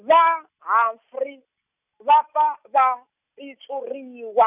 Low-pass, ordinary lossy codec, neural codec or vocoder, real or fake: 3.6 kHz; none; none; real